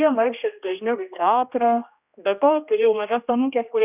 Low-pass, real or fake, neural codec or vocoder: 3.6 kHz; fake; codec, 16 kHz, 1 kbps, X-Codec, HuBERT features, trained on general audio